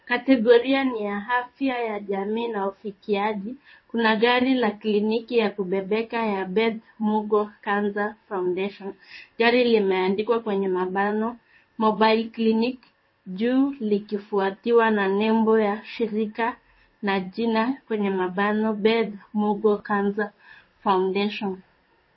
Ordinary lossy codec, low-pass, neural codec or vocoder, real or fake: MP3, 24 kbps; 7.2 kHz; codec, 16 kHz in and 24 kHz out, 1 kbps, XY-Tokenizer; fake